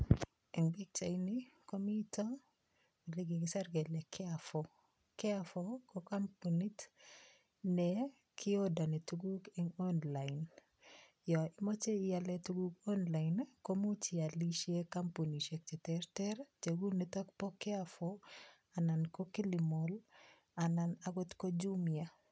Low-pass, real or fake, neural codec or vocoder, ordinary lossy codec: none; real; none; none